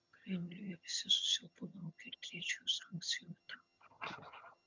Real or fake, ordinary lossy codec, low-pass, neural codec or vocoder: fake; MP3, 64 kbps; 7.2 kHz; vocoder, 22.05 kHz, 80 mel bands, HiFi-GAN